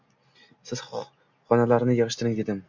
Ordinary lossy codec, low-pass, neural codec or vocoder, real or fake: MP3, 64 kbps; 7.2 kHz; none; real